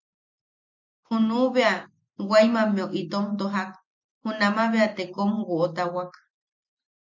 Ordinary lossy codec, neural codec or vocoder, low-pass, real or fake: MP3, 64 kbps; none; 7.2 kHz; real